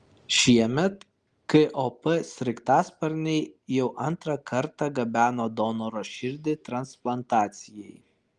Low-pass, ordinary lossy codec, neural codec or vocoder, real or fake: 10.8 kHz; Opus, 24 kbps; none; real